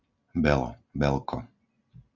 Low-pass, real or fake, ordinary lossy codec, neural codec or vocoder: 7.2 kHz; real; Opus, 64 kbps; none